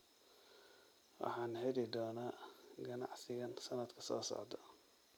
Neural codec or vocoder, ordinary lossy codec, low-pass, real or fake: vocoder, 44.1 kHz, 128 mel bands every 256 samples, BigVGAN v2; none; none; fake